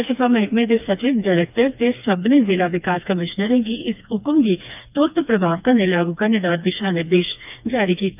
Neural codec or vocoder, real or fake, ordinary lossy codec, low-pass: codec, 16 kHz, 2 kbps, FreqCodec, smaller model; fake; none; 3.6 kHz